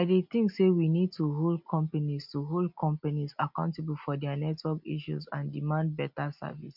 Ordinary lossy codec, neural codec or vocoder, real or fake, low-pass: none; none; real; 5.4 kHz